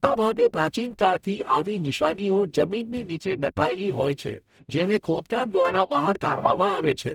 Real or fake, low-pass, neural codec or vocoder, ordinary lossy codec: fake; 19.8 kHz; codec, 44.1 kHz, 0.9 kbps, DAC; none